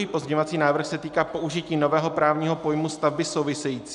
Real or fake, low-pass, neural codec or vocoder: real; 10.8 kHz; none